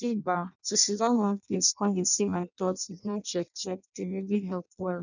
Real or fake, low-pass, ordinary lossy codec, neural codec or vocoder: fake; 7.2 kHz; none; codec, 16 kHz in and 24 kHz out, 0.6 kbps, FireRedTTS-2 codec